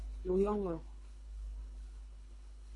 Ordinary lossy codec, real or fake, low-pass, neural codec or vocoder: MP3, 48 kbps; fake; 10.8 kHz; codec, 24 kHz, 3 kbps, HILCodec